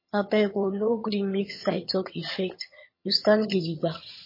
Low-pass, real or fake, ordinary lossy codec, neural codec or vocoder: 5.4 kHz; fake; MP3, 24 kbps; vocoder, 22.05 kHz, 80 mel bands, HiFi-GAN